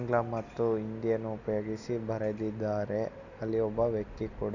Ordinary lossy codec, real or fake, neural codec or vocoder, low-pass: none; real; none; 7.2 kHz